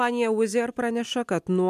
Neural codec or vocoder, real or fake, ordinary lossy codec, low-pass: none; real; MP3, 96 kbps; 14.4 kHz